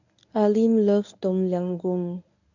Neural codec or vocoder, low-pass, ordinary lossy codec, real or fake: codec, 24 kHz, 0.9 kbps, WavTokenizer, medium speech release version 1; 7.2 kHz; none; fake